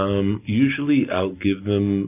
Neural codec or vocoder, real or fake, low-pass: none; real; 3.6 kHz